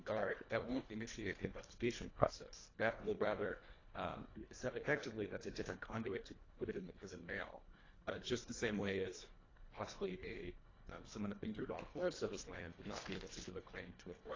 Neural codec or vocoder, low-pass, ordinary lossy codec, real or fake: codec, 24 kHz, 1.5 kbps, HILCodec; 7.2 kHz; AAC, 32 kbps; fake